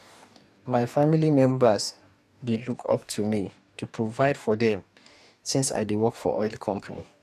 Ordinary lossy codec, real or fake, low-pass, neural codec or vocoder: none; fake; 14.4 kHz; codec, 44.1 kHz, 2.6 kbps, DAC